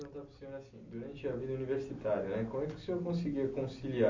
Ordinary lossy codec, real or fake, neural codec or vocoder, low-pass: MP3, 64 kbps; real; none; 7.2 kHz